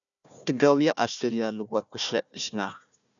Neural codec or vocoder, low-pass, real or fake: codec, 16 kHz, 1 kbps, FunCodec, trained on Chinese and English, 50 frames a second; 7.2 kHz; fake